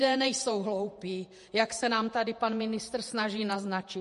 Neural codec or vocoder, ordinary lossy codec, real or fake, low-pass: vocoder, 48 kHz, 128 mel bands, Vocos; MP3, 48 kbps; fake; 14.4 kHz